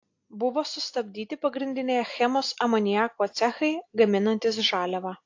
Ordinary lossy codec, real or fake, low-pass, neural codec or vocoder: AAC, 48 kbps; real; 7.2 kHz; none